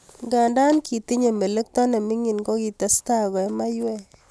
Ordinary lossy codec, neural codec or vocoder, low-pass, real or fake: none; none; none; real